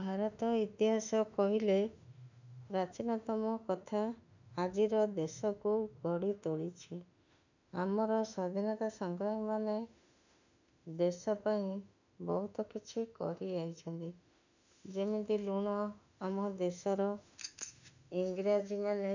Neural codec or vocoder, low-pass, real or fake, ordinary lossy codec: autoencoder, 48 kHz, 32 numbers a frame, DAC-VAE, trained on Japanese speech; 7.2 kHz; fake; none